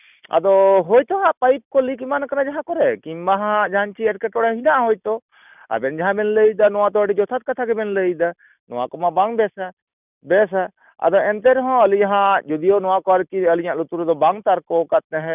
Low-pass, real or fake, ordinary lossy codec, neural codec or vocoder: 3.6 kHz; real; none; none